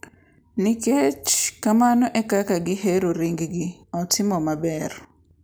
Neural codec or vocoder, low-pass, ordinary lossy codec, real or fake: none; none; none; real